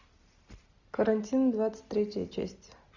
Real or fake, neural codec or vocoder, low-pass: real; none; 7.2 kHz